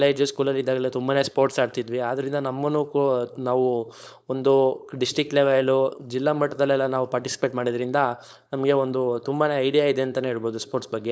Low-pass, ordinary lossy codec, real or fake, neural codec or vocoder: none; none; fake; codec, 16 kHz, 4.8 kbps, FACodec